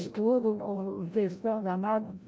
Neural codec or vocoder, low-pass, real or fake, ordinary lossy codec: codec, 16 kHz, 0.5 kbps, FreqCodec, larger model; none; fake; none